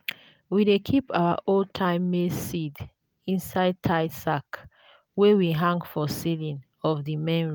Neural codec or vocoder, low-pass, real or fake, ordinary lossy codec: none; none; real; none